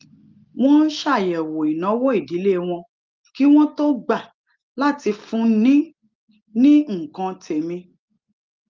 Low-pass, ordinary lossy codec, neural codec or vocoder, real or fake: 7.2 kHz; Opus, 32 kbps; none; real